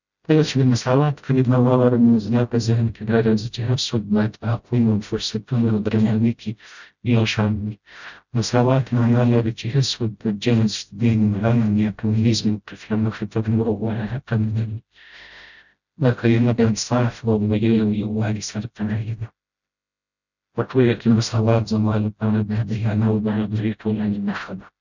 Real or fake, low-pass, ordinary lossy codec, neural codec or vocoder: fake; 7.2 kHz; none; codec, 16 kHz, 0.5 kbps, FreqCodec, smaller model